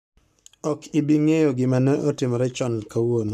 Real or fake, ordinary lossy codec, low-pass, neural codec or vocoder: fake; none; 14.4 kHz; codec, 44.1 kHz, 7.8 kbps, Pupu-Codec